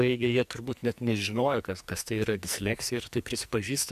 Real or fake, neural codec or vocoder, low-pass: fake; codec, 44.1 kHz, 2.6 kbps, SNAC; 14.4 kHz